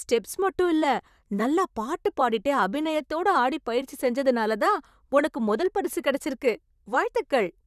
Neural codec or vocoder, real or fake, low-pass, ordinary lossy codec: vocoder, 44.1 kHz, 128 mel bands, Pupu-Vocoder; fake; 14.4 kHz; none